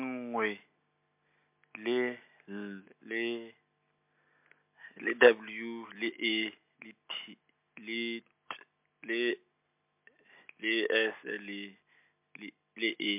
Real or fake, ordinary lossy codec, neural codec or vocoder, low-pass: real; MP3, 32 kbps; none; 3.6 kHz